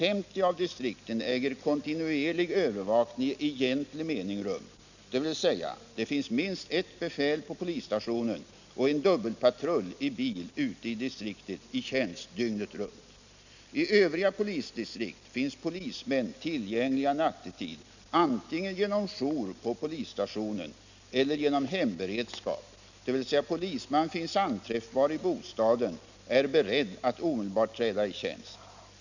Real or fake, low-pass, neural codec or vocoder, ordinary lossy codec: real; 7.2 kHz; none; none